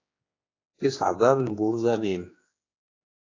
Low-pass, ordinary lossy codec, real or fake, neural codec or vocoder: 7.2 kHz; AAC, 32 kbps; fake; codec, 16 kHz, 2 kbps, X-Codec, HuBERT features, trained on general audio